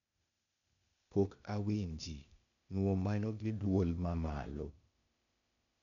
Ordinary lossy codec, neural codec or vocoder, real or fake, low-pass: none; codec, 16 kHz, 0.8 kbps, ZipCodec; fake; 7.2 kHz